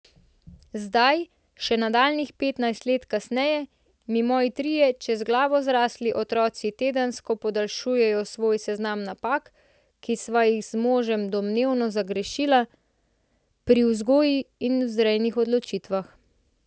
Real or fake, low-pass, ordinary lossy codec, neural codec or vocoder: real; none; none; none